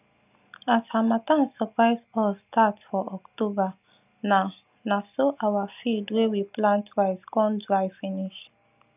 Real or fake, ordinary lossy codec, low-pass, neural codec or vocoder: real; none; 3.6 kHz; none